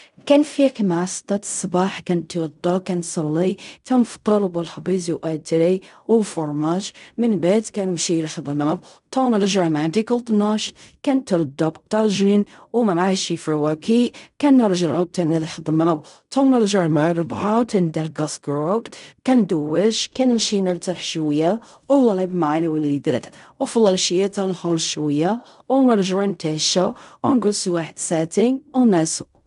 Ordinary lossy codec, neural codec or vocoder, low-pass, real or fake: none; codec, 16 kHz in and 24 kHz out, 0.4 kbps, LongCat-Audio-Codec, fine tuned four codebook decoder; 10.8 kHz; fake